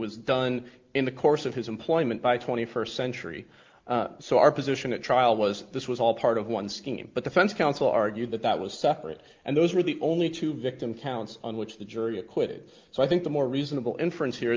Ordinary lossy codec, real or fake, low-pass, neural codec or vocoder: Opus, 32 kbps; real; 7.2 kHz; none